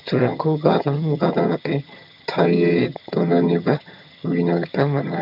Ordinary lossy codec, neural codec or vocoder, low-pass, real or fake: MP3, 48 kbps; vocoder, 22.05 kHz, 80 mel bands, HiFi-GAN; 5.4 kHz; fake